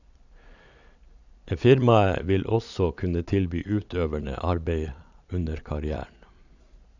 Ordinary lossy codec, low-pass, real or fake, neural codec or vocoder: none; 7.2 kHz; fake; vocoder, 22.05 kHz, 80 mel bands, Vocos